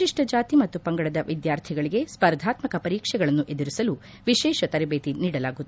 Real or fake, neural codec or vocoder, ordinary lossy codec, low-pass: real; none; none; none